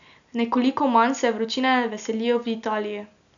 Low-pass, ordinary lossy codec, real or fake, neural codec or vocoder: 7.2 kHz; none; real; none